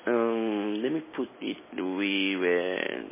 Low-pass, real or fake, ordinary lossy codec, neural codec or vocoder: 3.6 kHz; real; MP3, 16 kbps; none